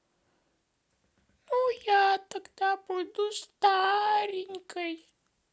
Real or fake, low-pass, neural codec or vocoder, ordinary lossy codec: real; none; none; none